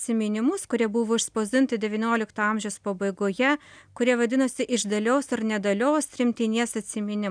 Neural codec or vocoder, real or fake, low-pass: none; real; 9.9 kHz